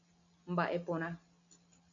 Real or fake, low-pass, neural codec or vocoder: real; 7.2 kHz; none